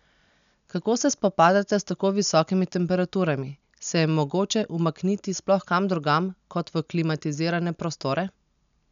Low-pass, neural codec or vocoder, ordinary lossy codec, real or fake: 7.2 kHz; none; none; real